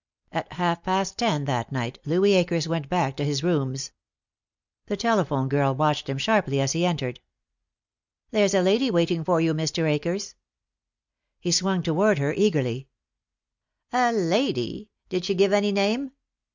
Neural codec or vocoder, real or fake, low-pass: none; real; 7.2 kHz